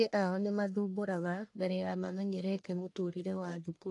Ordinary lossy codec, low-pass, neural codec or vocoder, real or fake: AAC, 48 kbps; 10.8 kHz; codec, 44.1 kHz, 1.7 kbps, Pupu-Codec; fake